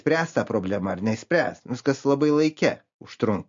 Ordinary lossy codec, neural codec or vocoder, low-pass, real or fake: MP3, 48 kbps; none; 7.2 kHz; real